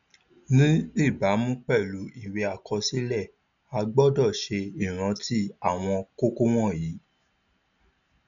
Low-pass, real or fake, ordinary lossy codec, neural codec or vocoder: 7.2 kHz; real; none; none